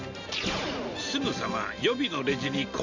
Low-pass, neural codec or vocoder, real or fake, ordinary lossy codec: 7.2 kHz; none; real; none